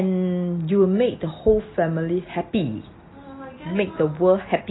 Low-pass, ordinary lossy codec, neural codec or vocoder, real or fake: 7.2 kHz; AAC, 16 kbps; none; real